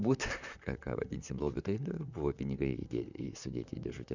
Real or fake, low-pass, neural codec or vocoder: fake; 7.2 kHz; vocoder, 44.1 kHz, 128 mel bands, Pupu-Vocoder